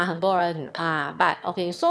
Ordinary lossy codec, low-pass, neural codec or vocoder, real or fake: none; none; autoencoder, 22.05 kHz, a latent of 192 numbers a frame, VITS, trained on one speaker; fake